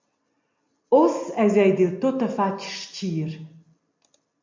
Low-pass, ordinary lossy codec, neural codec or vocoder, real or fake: 7.2 kHz; MP3, 48 kbps; none; real